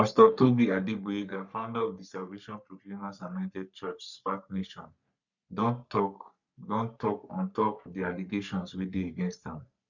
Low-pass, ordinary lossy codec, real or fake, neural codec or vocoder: 7.2 kHz; none; fake; codec, 44.1 kHz, 3.4 kbps, Pupu-Codec